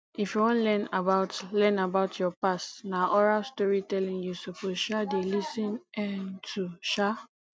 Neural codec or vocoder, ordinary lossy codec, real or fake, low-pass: none; none; real; none